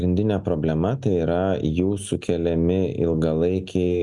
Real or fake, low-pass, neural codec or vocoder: real; 10.8 kHz; none